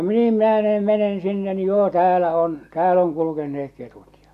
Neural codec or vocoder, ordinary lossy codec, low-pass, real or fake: none; none; 14.4 kHz; real